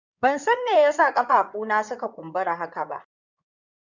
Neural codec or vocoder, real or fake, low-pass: codec, 16 kHz in and 24 kHz out, 2.2 kbps, FireRedTTS-2 codec; fake; 7.2 kHz